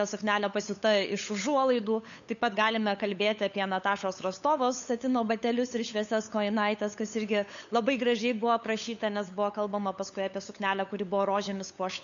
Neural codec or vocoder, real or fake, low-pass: codec, 16 kHz, 8 kbps, FunCodec, trained on LibriTTS, 25 frames a second; fake; 7.2 kHz